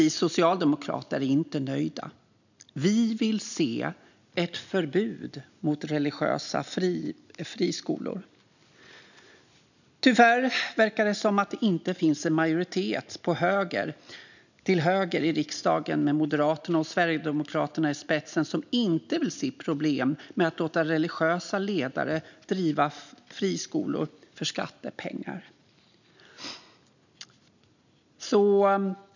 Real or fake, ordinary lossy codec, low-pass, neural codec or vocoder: real; none; 7.2 kHz; none